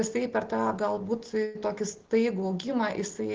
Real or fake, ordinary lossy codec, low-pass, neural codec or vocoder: real; Opus, 16 kbps; 7.2 kHz; none